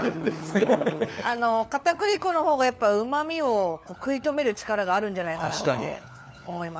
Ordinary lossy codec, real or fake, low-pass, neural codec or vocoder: none; fake; none; codec, 16 kHz, 4 kbps, FunCodec, trained on LibriTTS, 50 frames a second